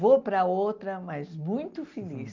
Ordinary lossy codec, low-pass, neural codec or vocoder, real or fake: Opus, 24 kbps; 7.2 kHz; none; real